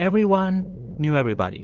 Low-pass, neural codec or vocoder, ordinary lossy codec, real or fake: 7.2 kHz; codec, 16 kHz, 2 kbps, FunCodec, trained on LibriTTS, 25 frames a second; Opus, 16 kbps; fake